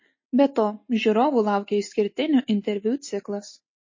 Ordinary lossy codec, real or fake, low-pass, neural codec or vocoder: MP3, 32 kbps; real; 7.2 kHz; none